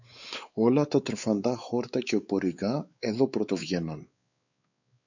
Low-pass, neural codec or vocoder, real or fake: 7.2 kHz; codec, 16 kHz, 4 kbps, X-Codec, WavLM features, trained on Multilingual LibriSpeech; fake